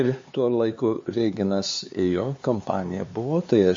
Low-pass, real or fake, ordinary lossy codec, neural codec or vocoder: 7.2 kHz; fake; MP3, 32 kbps; codec, 16 kHz, 4 kbps, X-Codec, HuBERT features, trained on LibriSpeech